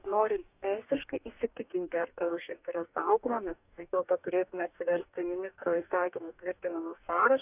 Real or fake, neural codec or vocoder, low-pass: fake; codec, 44.1 kHz, 2.6 kbps, DAC; 3.6 kHz